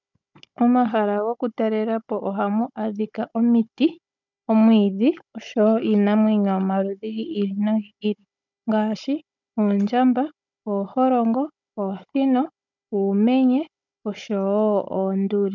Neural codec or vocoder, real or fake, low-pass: codec, 16 kHz, 16 kbps, FunCodec, trained on Chinese and English, 50 frames a second; fake; 7.2 kHz